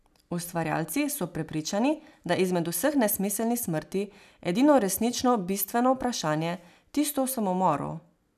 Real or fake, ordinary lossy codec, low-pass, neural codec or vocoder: real; none; 14.4 kHz; none